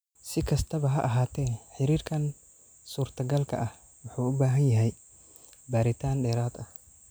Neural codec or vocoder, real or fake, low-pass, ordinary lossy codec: none; real; none; none